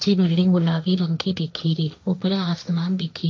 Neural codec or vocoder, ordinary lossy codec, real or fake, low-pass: codec, 16 kHz, 1.1 kbps, Voila-Tokenizer; none; fake; none